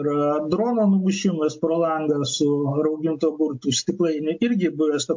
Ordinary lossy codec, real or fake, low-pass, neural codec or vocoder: MP3, 48 kbps; real; 7.2 kHz; none